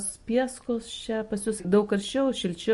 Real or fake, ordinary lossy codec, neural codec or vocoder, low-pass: real; MP3, 48 kbps; none; 14.4 kHz